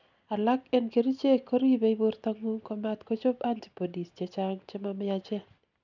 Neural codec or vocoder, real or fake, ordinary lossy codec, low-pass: none; real; none; 7.2 kHz